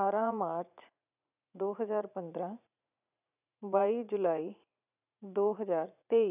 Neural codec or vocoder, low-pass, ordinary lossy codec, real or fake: vocoder, 44.1 kHz, 80 mel bands, Vocos; 3.6 kHz; none; fake